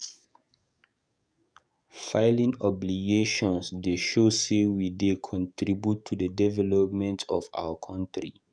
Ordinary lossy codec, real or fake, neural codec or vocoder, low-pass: none; fake; codec, 44.1 kHz, 7.8 kbps, DAC; 9.9 kHz